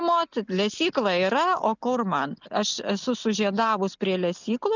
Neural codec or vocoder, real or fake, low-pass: none; real; 7.2 kHz